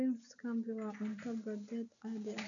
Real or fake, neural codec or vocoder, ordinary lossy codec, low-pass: real; none; none; 7.2 kHz